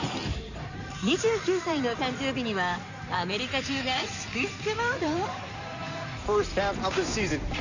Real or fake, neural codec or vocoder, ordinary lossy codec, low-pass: fake; codec, 16 kHz in and 24 kHz out, 2.2 kbps, FireRedTTS-2 codec; none; 7.2 kHz